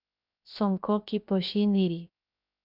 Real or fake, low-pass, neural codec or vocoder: fake; 5.4 kHz; codec, 16 kHz, 0.7 kbps, FocalCodec